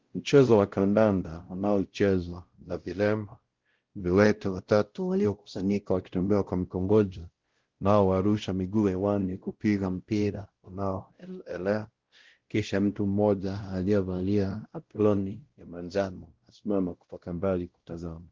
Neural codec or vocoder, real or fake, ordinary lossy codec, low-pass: codec, 16 kHz, 0.5 kbps, X-Codec, WavLM features, trained on Multilingual LibriSpeech; fake; Opus, 16 kbps; 7.2 kHz